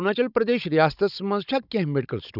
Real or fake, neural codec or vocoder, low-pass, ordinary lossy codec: fake; codec, 16 kHz, 16 kbps, FunCodec, trained on Chinese and English, 50 frames a second; 5.4 kHz; none